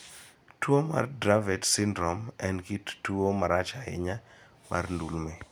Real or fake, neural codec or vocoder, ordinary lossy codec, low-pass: real; none; none; none